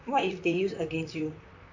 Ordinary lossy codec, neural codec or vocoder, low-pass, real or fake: AAC, 48 kbps; vocoder, 44.1 kHz, 128 mel bands, Pupu-Vocoder; 7.2 kHz; fake